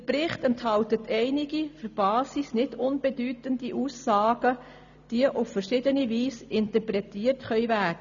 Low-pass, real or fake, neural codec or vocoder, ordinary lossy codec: 7.2 kHz; real; none; none